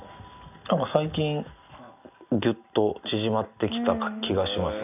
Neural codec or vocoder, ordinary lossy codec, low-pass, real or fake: none; none; 3.6 kHz; real